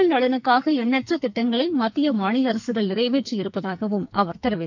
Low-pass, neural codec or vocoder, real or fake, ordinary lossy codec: 7.2 kHz; codec, 44.1 kHz, 2.6 kbps, SNAC; fake; none